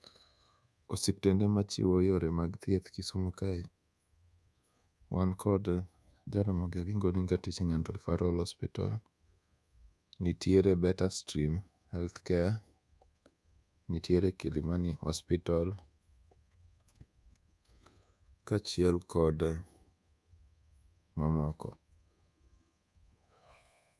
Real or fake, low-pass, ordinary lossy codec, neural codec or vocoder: fake; none; none; codec, 24 kHz, 1.2 kbps, DualCodec